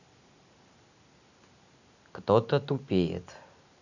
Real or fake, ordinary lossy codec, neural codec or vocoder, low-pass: real; none; none; 7.2 kHz